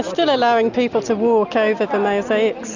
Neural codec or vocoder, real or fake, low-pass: none; real; 7.2 kHz